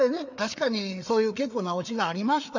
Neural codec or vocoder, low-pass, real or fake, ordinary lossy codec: codec, 16 kHz, 4 kbps, FreqCodec, larger model; 7.2 kHz; fake; none